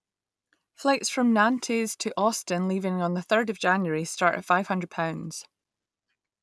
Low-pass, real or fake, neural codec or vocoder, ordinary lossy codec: none; real; none; none